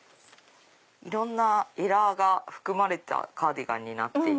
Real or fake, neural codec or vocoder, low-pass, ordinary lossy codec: real; none; none; none